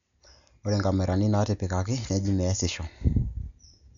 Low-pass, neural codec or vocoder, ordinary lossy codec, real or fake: 7.2 kHz; none; none; real